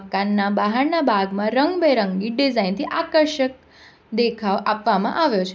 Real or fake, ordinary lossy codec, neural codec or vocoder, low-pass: real; none; none; none